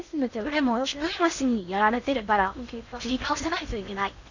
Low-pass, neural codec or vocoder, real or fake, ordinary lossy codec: 7.2 kHz; codec, 16 kHz in and 24 kHz out, 0.8 kbps, FocalCodec, streaming, 65536 codes; fake; none